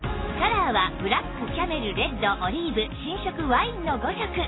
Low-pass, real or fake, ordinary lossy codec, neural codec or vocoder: 7.2 kHz; real; AAC, 16 kbps; none